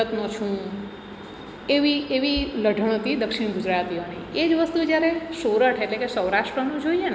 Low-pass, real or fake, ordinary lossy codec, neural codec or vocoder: none; real; none; none